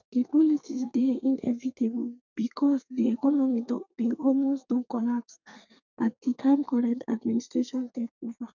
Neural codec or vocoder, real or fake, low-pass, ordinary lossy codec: codec, 32 kHz, 1.9 kbps, SNAC; fake; 7.2 kHz; none